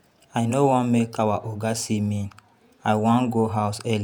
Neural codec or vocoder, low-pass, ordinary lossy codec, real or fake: vocoder, 48 kHz, 128 mel bands, Vocos; none; none; fake